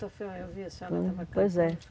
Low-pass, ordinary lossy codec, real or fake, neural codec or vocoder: none; none; real; none